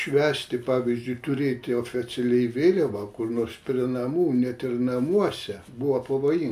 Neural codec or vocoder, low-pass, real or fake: none; 14.4 kHz; real